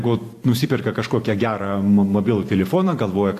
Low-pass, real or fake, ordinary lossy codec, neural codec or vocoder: 14.4 kHz; fake; AAC, 64 kbps; vocoder, 44.1 kHz, 128 mel bands every 512 samples, BigVGAN v2